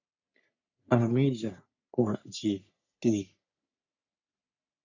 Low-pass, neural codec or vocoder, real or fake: 7.2 kHz; codec, 44.1 kHz, 3.4 kbps, Pupu-Codec; fake